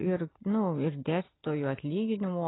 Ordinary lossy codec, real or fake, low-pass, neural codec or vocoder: AAC, 16 kbps; real; 7.2 kHz; none